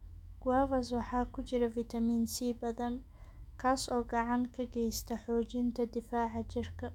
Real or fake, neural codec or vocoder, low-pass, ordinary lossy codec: fake; autoencoder, 48 kHz, 128 numbers a frame, DAC-VAE, trained on Japanese speech; 19.8 kHz; none